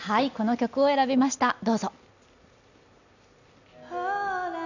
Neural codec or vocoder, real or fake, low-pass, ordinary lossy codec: none; real; 7.2 kHz; none